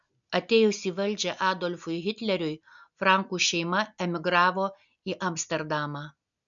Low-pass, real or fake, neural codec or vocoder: 7.2 kHz; real; none